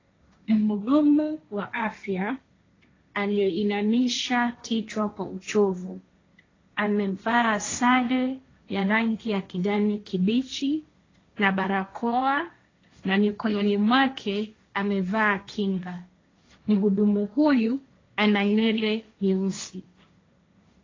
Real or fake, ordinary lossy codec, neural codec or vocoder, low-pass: fake; AAC, 32 kbps; codec, 16 kHz, 1.1 kbps, Voila-Tokenizer; 7.2 kHz